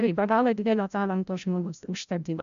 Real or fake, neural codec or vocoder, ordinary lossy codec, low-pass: fake; codec, 16 kHz, 0.5 kbps, FreqCodec, larger model; AAC, 96 kbps; 7.2 kHz